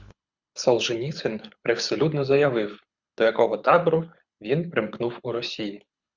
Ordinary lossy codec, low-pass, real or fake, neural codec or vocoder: Opus, 64 kbps; 7.2 kHz; fake; codec, 24 kHz, 6 kbps, HILCodec